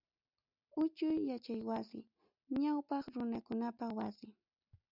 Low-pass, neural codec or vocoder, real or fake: 5.4 kHz; none; real